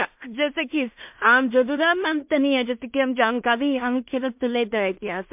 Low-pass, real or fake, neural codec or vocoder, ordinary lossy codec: 3.6 kHz; fake; codec, 16 kHz in and 24 kHz out, 0.4 kbps, LongCat-Audio-Codec, two codebook decoder; MP3, 32 kbps